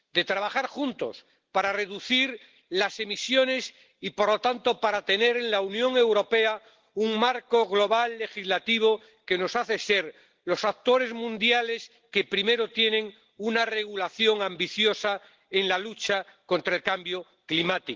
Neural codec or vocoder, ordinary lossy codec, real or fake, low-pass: none; Opus, 16 kbps; real; 7.2 kHz